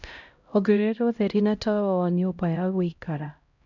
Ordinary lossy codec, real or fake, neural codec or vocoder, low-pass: none; fake; codec, 16 kHz, 0.5 kbps, X-Codec, HuBERT features, trained on LibriSpeech; 7.2 kHz